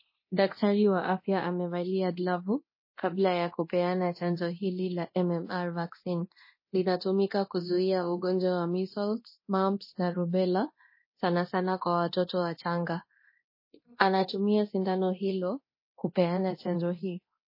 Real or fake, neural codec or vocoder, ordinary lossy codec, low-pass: fake; codec, 24 kHz, 0.9 kbps, DualCodec; MP3, 24 kbps; 5.4 kHz